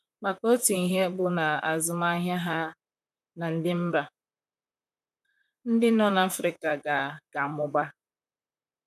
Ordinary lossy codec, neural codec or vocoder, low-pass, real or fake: none; vocoder, 44.1 kHz, 128 mel bands, Pupu-Vocoder; 14.4 kHz; fake